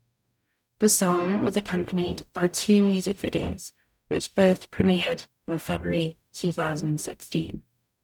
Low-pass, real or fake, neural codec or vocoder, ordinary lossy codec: 19.8 kHz; fake; codec, 44.1 kHz, 0.9 kbps, DAC; none